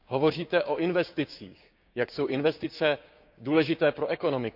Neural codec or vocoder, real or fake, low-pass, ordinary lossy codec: codec, 16 kHz, 6 kbps, DAC; fake; 5.4 kHz; none